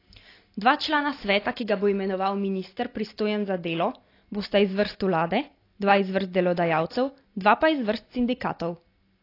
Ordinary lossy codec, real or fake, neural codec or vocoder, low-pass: AAC, 32 kbps; real; none; 5.4 kHz